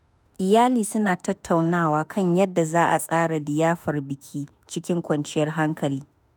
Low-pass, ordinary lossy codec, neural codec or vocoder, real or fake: none; none; autoencoder, 48 kHz, 32 numbers a frame, DAC-VAE, trained on Japanese speech; fake